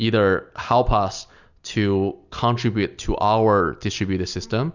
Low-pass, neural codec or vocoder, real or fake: 7.2 kHz; none; real